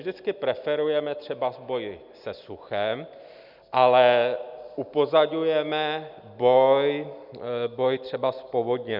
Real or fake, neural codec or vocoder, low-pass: real; none; 5.4 kHz